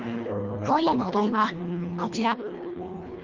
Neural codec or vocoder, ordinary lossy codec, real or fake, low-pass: codec, 24 kHz, 1.5 kbps, HILCodec; Opus, 24 kbps; fake; 7.2 kHz